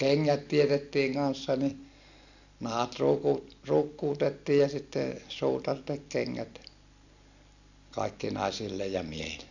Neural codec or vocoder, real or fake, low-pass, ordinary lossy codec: none; real; 7.2 kHz; none